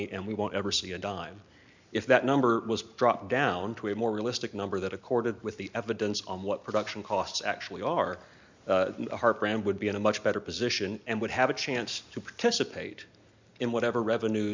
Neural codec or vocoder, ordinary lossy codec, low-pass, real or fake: none; MP3, 64 kbps; 7.2 kHz; real